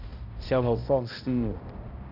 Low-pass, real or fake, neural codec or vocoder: 5.4 kHz; fake; codec, 16 kHz, 0.5 kbps, X-Codec, HuBERT features, trained on balanced general audio